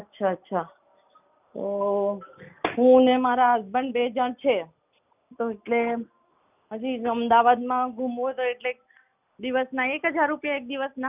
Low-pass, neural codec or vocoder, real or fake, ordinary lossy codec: 3.6 kHz; none; real; none